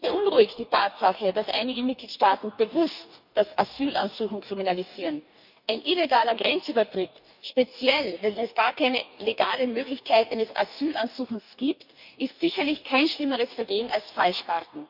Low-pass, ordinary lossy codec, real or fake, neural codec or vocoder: 5.4 kHz; none; fake; codec, 44.1 kHz, 2.6 kbps, DAC